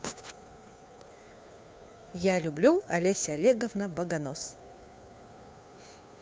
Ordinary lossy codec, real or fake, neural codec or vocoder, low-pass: none; fake; codec, 16 kHz, 2 kbps, FunCodec, trained on Chinese and English, 25 frames a second; none